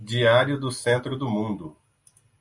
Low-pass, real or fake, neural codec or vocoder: 10.8 kHz; real; none